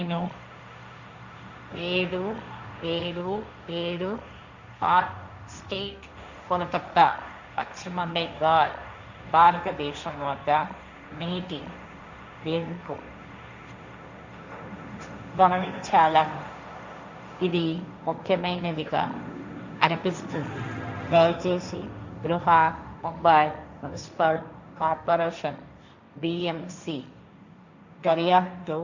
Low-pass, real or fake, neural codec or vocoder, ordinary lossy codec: 7.2 kHz; fake; codec, 16 kHz, 1.1 kbps, Voila-Tokenizer; Opus, 64 kbps